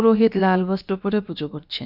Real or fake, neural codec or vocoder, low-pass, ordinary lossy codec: fake; codec, 16 kHz, about 1 kbps, DyCAST, with the encoder's durations; 5.4 kHz; none